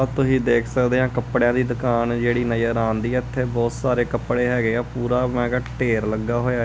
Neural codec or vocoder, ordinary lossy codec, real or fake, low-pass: none; none; real; none